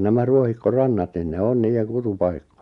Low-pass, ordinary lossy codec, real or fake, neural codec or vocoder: 10.8 kHz; none; real; none